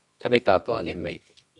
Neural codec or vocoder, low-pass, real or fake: codec, 24 kHz, 0.9 kbps, WavTokenizer, medium music audio release; 10.8 kHz; fake